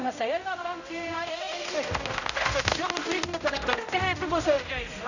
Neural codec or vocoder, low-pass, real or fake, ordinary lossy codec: codec, 16 kHz, 0.5 kbps, X-Codec, HuBERT features, trained on general audio; 7.2 kHz; fake; none